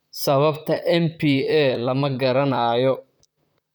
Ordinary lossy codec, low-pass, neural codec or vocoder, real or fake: none; none; vocoder, 44.1 kHz, 128 mel bands, Pupu-Vocoder; fake